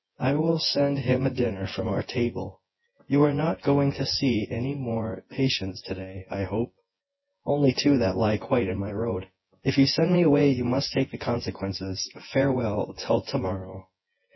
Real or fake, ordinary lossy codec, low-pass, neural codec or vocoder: fake; MP3, 24 kbps; 7.2 kHz; vocoder, 24 kHz, 100 mel bands, Vocos